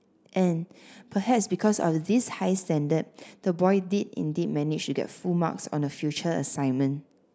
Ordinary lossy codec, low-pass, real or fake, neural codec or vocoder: none; none; real; none